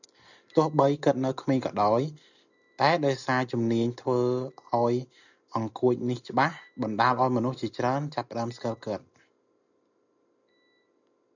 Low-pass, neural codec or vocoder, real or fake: 7.2 kHz; none; real